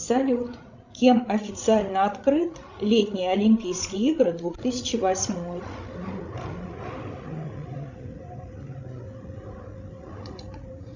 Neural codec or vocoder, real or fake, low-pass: codec, 16 kHz, 16 kbps, FreqCodec, larger model; fake; 7.2 kHz